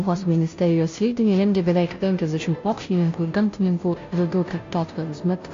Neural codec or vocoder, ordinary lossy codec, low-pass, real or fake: codec, 16 kHz, 0.5 kbps, FunCodec, trained on Chinese and English, 25 frames a second; AAC, 48 kbps; 7.2 kHz; fake